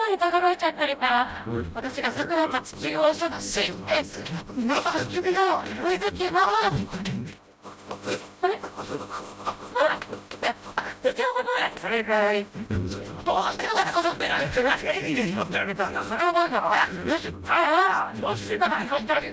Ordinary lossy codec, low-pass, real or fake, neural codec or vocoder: none; none; fake; codec, 16 kHz, 0.5 kbps, FreqCodec, smaller model